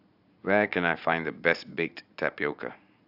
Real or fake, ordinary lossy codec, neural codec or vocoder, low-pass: fake; AAC, 48 kbps; vocoder, 44.1 kHz, 80 mel bands, Vocos; 5.4 kHz